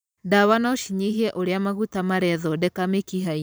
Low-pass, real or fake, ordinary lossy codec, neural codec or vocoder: none; fake; none; vocoder, 44.1 kHz, 128 mel bands every 512 samples, BigVGAN v2